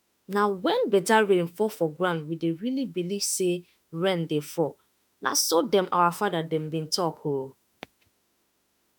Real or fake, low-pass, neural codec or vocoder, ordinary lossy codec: fake; none; autoencoder, 48 kHz, 32 numbers a frame, DAC-VAE, trained on Japanese speech; none